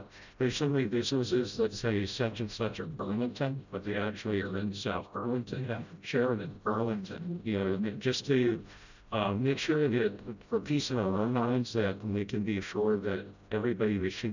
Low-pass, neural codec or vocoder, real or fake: 7.2 kHz; codec, 16 kHz, 0.5 kbps, FreqCodec, smaller model; fake